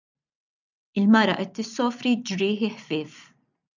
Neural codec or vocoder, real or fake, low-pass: none; real; 7.2 kHz